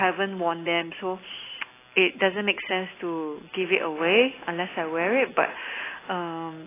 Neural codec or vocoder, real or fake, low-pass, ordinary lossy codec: none; real; 3.6 kHz; AAC, 16 kbps